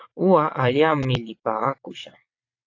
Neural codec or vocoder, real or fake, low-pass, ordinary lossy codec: vocoder, 22.05 kHz, 80 mel bands, WaveNeXt; fake; 7.2 kHz; AAC, 48 kbps